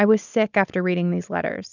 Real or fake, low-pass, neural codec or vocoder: real; 7.2 kHz; none